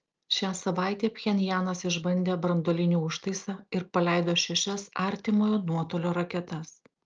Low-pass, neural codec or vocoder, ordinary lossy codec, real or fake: 7.2 kHz; none; Opus, 24 kbps; real